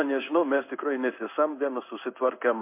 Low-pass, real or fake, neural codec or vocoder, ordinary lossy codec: 3.6 kHz; fake; codec, 16 kHz in and 24 kHz out, 1 kbps, XY-Tokenizer; MP3, 32 kbps